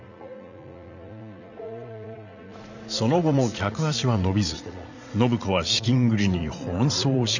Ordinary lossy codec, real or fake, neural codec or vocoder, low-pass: none; fake; vocoder, 44.1 kHz, 80 mel bands, Vocos; 7.2 kHz